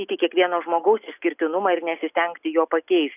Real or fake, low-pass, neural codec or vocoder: real; 3.6 kHz; none